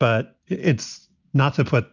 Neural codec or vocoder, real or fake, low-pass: none; real; 7.2 kHz